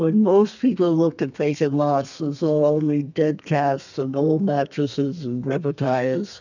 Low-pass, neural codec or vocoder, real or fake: 7.2 kHz; codec, 32 kHz, 1.9 kbps, SNAC; fake